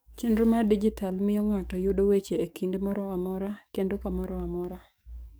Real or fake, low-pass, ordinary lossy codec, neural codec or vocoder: fake; none; none; codec, 44.1 kHz, 7.8 kbps, DAC